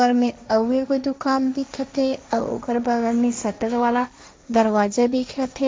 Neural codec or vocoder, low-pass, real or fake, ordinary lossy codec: codec, 16 kHz, 1.1 kbps, Voila-Tokenizer; none; fake; none